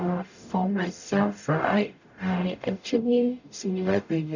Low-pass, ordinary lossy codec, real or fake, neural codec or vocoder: 7.2 kHz; none; fake; codec, 44.1 kHz, 0.9 kbps, DAC